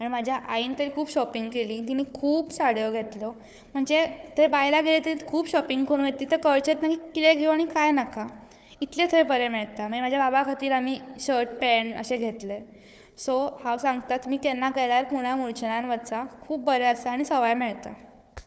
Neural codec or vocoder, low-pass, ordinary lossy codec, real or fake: codec, 16 kHz, 4 kbps, FunCodec, trained on Chinese and English, 50 frames a second; none; none; fake